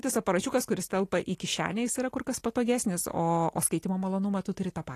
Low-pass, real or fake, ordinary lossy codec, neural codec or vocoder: 14.4 kHz; real; AAC, 48 kbps; none